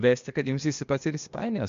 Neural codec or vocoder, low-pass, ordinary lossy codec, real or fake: codec, 16 kHz, 0.8 kbps, ZipCodec; 7.2 kHz; MP3, 64 kbps; fake